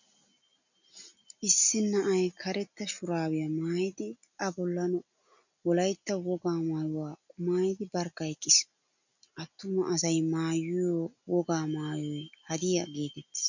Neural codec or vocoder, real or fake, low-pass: none; real; 7.2 kHz